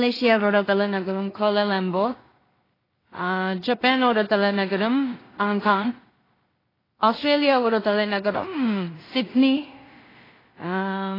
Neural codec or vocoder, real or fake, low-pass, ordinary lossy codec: codec, 16 kHz in and 24 kHz out, 0.4 kbps, LongCat-Audio-Codec, two codebook decoder; fake; 5.4 kHz; AAC, 24 kbps